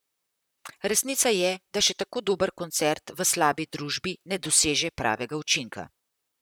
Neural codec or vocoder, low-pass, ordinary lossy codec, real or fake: vocoder, 44.1 kHz, 128 mel bands, Pupu-Vocoder; none; none; fake